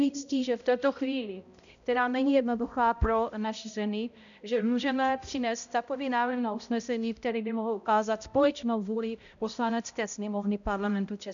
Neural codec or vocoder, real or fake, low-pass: codec, 16 kHz, 0.5 kbps, X-Codec, HuBERT features, trained on balanced general audio; fake; 7.2 kHz